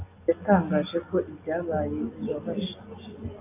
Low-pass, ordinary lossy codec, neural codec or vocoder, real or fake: 3.6 kHz; AAC, 32 kbps; none; real